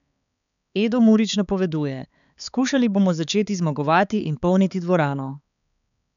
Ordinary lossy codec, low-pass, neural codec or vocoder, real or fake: none; 7.2 kHz; codec, 16 kHz, 4 kbps, X-Codec, HuBERT features, trained on balanced general audio; fake